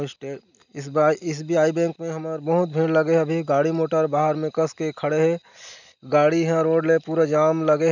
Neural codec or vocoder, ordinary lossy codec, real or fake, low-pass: none; none; real; 7.2 kHz